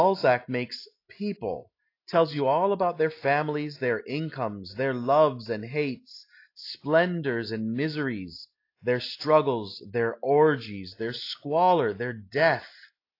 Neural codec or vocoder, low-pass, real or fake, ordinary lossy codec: none; 5.4 kHz; real; AAC, 32 kbps